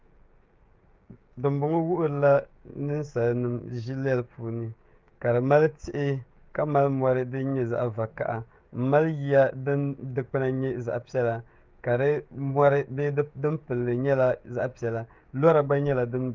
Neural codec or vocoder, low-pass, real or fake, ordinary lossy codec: codec, 16 kHz, 16 kbps, FreqCodec, smaller model; 7.2 kHz; fake; Opus, 32 kbps